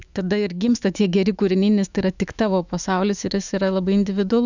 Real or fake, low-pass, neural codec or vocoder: fake; 7.2 kHz; autoencoder, 48 kHz, 128 numbers a frame, DAC-VAE, trained on Japanese speech